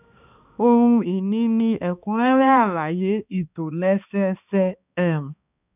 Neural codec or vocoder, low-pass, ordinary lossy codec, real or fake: codec, 16 kHz, 2 kbps, X-Codec, HuBERT features, trained on balanced general audio; 3.6 kHz; none; fake